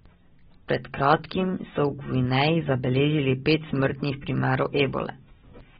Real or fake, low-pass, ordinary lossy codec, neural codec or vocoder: real; 7.2 kHz; AAC, 16 kbps; none